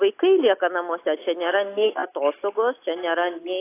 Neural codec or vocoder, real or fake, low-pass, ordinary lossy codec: none; real; 3.6 kHz; AAC, 24 kbps